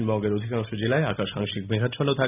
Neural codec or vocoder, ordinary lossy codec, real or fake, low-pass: none; none; real; 3.6 kHz